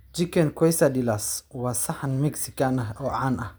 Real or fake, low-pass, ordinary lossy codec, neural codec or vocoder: real; none; none; none